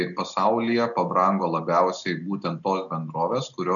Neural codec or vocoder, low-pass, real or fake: none; 7.2 kHz; real